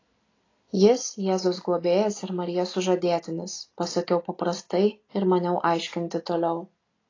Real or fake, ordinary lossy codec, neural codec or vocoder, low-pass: real; AAC, 32 kbps; none; 7.2 kHz